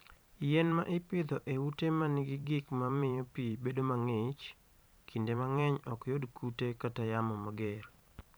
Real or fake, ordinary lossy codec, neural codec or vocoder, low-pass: fake; none; vocoder, 44.1 kHz, 128 mel bands every 512 samples, BigVGAN v2; none